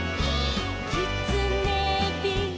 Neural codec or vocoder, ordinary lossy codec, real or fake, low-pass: none; none; real; none